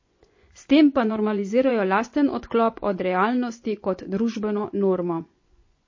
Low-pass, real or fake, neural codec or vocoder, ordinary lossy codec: 7.2 kHz; fake; vocoder, 24 kHz, 100 mel bands, Vocos; MP3, 32 kbps